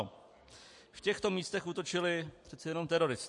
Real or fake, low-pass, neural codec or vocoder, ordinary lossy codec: real; 10.8 kHz; none; MP3, 48 kbps